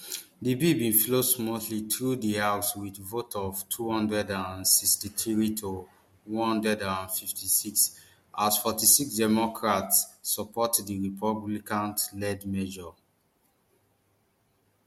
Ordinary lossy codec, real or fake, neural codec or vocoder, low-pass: MP3, 64 kbps; real; none; 19.8 kHz